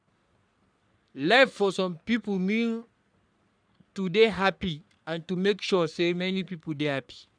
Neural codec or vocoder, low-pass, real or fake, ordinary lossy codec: codec, 44.1 kHz, 3.4 kbps, Pupu-Codec; 9.9 kHz; fake; none